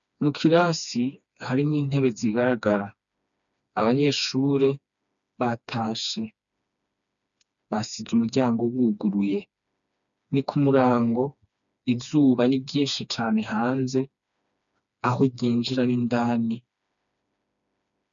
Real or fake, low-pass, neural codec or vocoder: fake; 7.2 kHz; codec, 16 kHz, 2 kbps, FreqCodec, smaller model